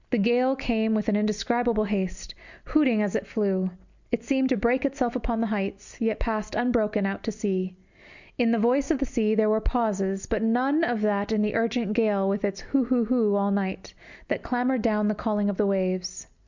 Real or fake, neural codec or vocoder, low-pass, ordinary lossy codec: real; none; 7.2 kHz; Opus, 64 kbps